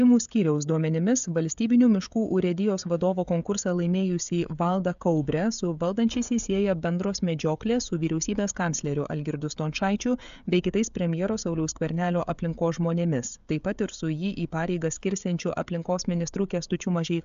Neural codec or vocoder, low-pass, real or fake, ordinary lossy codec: codec, 16 kHz, 16 kbps, FreqCodec, smaller model; 7.2 kHz; fake; AAC, 96 kbps